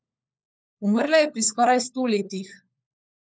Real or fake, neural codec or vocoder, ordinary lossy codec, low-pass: fake; codec, 16 kHz, 4 kbps, FunCodec, trained on LibriTTS, 50 frames a second; none; none